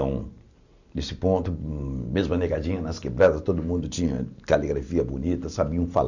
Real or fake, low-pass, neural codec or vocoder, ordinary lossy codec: real; 7.2 kHz; none; none